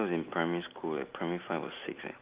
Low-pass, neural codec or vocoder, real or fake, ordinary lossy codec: 3.6 kHz; none; real; Opus, 32 kbps